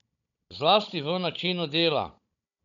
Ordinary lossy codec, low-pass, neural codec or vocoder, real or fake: none; 7.2 kHz; codec, 16 kHz, 16 kbps, FunCodec, trained on Chinese and English, 50 frames a second; fake